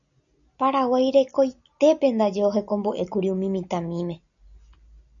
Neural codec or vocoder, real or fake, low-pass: none; real; 7.2 kHz